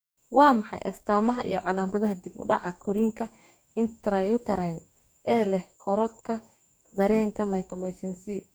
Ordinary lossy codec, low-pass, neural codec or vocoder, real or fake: none; none; codec, 44.1 kHz, 2.6 kbps, DAC; fake